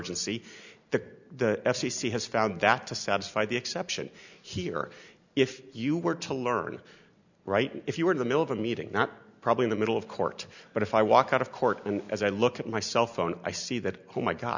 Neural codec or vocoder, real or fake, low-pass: none; real; 7.2 kHz